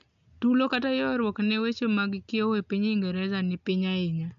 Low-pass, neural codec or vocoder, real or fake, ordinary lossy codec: 7.2 kHz; none; real; AAC, 96 kbps